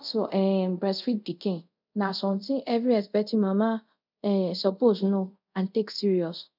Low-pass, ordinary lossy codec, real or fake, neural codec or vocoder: 5.4 kHz; none; fake; codec, 24 kHz, 0.5 kbps, DualCodec